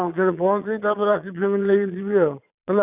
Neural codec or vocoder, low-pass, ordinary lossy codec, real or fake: vocoder, 22.05 kHz, 80 mel bands, Vocos; 3.6 kHz; none; fake